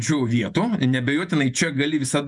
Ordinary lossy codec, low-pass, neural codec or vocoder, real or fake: MP3, 96 kbps; 10.8 kHz; vocoder, 48 kHz, 128 mel bands, Vocos; fake